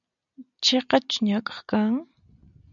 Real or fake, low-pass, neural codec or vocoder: real; 7.2 kHz; none